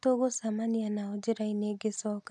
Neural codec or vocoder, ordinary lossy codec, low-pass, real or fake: none; none; none; real